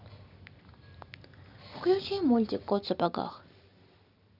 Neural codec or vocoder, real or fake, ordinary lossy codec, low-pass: vocoder, 44.1 kHz, 128 mel bands every 256 samples, BigVGAN v2; fake; none; 5.4 kHz